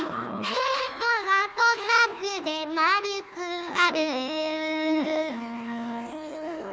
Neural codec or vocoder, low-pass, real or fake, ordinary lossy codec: codec, 16 kHz, 1 kbps, FunCodec, trained on Chinese and English, 50 frames a second; none; fake; none